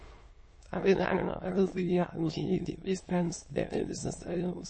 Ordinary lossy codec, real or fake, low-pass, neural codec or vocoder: MP3, 32 kbps; fake; 9.9 kHz; autoencoder, 22.05 kHz, a latent of 192 numbers a frame, VITS, trained on many speakers